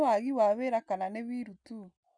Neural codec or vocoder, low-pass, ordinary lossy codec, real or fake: none; 9.9 kHz; none; real